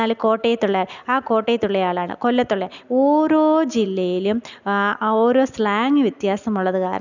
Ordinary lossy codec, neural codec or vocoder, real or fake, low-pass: none; none; real; 7.2 kHz